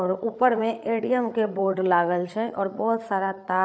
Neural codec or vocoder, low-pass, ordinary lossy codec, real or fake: codec, 16 kHz, 8 kbps, FreqCodec, larger model; none; none; fake